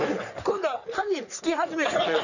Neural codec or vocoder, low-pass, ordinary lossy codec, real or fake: codec, 44.1 kHz, 3.4 kbps, Pupu-Codec; 7.2 kHz; none; fake